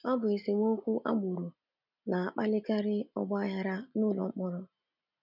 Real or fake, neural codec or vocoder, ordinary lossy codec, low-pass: real; none; none; 5.4 kHz